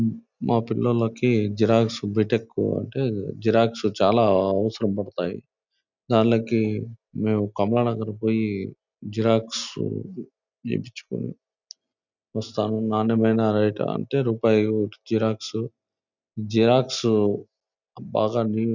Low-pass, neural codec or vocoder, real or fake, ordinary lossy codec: 7.2 kHz; none; real; none